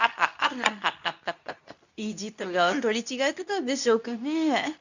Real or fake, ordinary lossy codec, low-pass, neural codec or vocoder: fake; none; 7.2 kHz; codec, 24 kHz, 0.9 kbps, WavTokenizer, medium speech release version 2